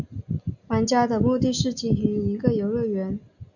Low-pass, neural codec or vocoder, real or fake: 7.2 kHz; none; real